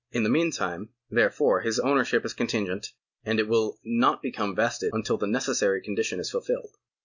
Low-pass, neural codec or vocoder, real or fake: 7.2 kHz; none; real